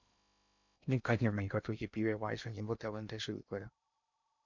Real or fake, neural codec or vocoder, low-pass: fake; codec, 16 kHz in and 24 kHz out, 0.6 kbps, FocalCodec, streaming, 2048 codes; 7.2 kHz